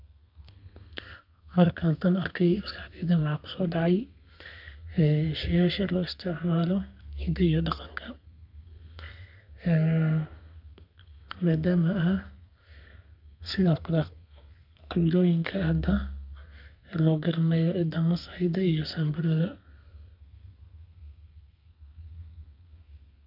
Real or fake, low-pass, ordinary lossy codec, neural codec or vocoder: fake; 5.4 kHz; none; codec, 32 kHz, 1.9 kbps, SNAC